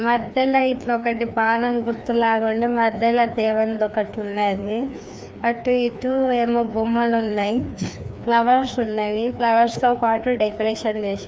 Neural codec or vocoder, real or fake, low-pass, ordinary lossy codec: codec, 16 kHz, 2 kbps, FreqCodec, larger model; fake; none; none